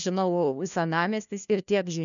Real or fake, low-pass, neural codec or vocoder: fake; 7.2 kHz; codec, 16 kHz, 1 kbps, FunCodec, trained on LibriTTS, 50 frames a second